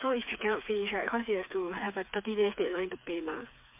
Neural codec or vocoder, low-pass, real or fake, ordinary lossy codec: codec, 16 kHz, 4 kbps, FreqCodec, smaller model; 3.6 kHz; fake; MP3, 32 kbps